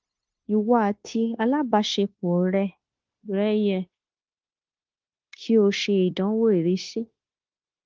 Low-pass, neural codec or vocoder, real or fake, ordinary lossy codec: 7.2 kHz; codec, 16 kHz, 0.9 kbps, LongCat-Audio-Codec; fake; Opus, 16 kbps